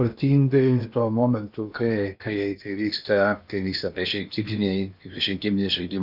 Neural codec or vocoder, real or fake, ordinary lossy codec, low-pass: codec, 16 kHz in and 24 kHz out, 0.8 kbps, FocalCodec, streaming, 65536 codes; fake; Opus, 64 kbps; 5.4 kHz